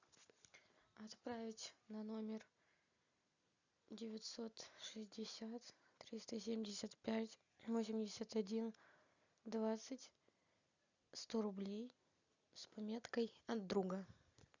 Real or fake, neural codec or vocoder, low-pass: real; none; 7.2 kHz